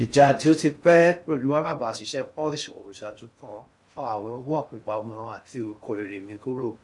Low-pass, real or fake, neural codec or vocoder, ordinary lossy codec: 10.8 kHz; fake; codec, 16 kHz in and 24 kHz out, 0.6 kbps, FocalCodec, streaming, 4096 codes; MP3, 64 kbps